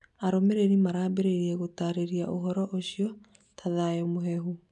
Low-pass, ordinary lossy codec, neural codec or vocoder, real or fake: 10.8 kHz; none; none; real